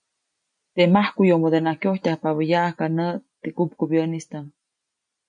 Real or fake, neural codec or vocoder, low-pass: real; none; 9.9 kHz